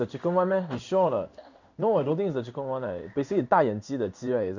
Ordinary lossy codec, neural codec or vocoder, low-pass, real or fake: none; codec, 16 kHz in and 24 kHz out, 1 kbps, XY-Tokenizer; 7.2 kHz; fake